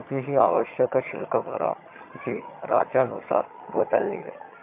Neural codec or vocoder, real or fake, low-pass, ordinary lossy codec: vocoder, 22.05 kHz, 80 mel bands, HiFi-GAN; fake; 3.6 kHz; MP3, 24 kbps